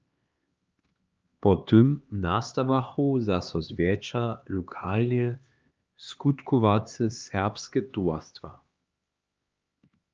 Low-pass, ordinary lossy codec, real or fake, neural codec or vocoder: 7.2 kHz; Opus, 24 kbps; fake; codec, 16 kHz, 2 kbps, X-Codec, HuBERT features, trained on LibriSpeech